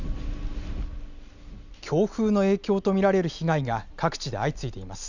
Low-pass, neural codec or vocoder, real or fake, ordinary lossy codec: 7.2 kHz; none; real; none